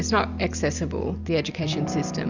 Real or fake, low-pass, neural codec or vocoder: real; 7.2 kHz; none